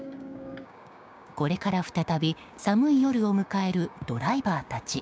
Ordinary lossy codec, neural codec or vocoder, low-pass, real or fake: none; codec, 16 kHz, 6 kbps, DAC; none; fake